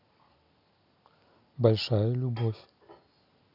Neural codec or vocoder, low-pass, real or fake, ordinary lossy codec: none; 5.4 kHz; real; none